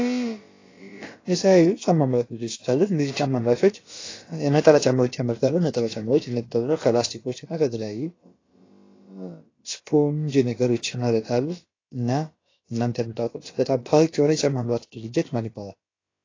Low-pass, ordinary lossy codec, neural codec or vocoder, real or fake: 7.2 kHz; AAC, 32 kbps; codec, 16 kHz, about 1 kbps, DyCAST, with the encoder's durations; fake